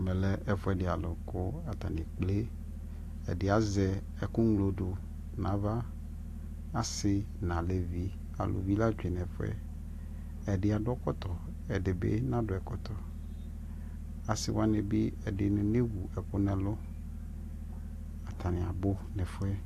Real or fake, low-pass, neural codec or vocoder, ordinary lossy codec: real; 14.4 kHz; none; AAC, 64 kbps